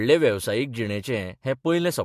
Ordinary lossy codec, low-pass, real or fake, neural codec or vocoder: MP3, 64 kbps; 14.4 kHz; real; none